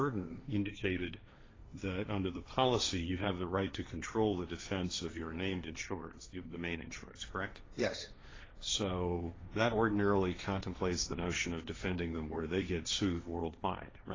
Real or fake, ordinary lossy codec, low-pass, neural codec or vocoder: fake; AAC, 32 kbps; 7.2 kHz; codec, 16 kHz, 1.1 kbps, Voila-Tokenizer